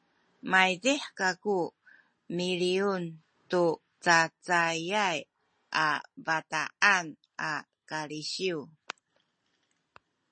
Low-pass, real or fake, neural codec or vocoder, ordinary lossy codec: 9.9 kHz; real; none; MP3, 32 kbps